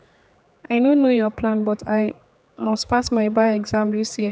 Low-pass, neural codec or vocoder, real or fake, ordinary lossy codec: none; codec, 16 kHz, 4 kbps, X-Codec, HuBERT features, trained on general audio; fake; none